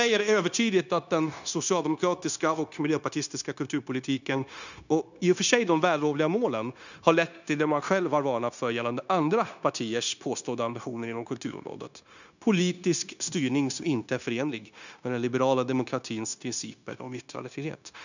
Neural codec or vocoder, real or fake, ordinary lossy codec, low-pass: codec, 16 kHz, 0.9 kbps, LongCat-Audio-Codec; fake; none; 7.2 kHz